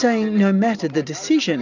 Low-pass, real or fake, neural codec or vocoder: 7.2 kHz; real; none